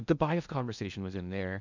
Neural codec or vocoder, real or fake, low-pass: codec, 16 kHz in and 24 kHz out, 0.6 kbps, FocalCodec, streaming, 2048 codes; fake; 7.2 kHz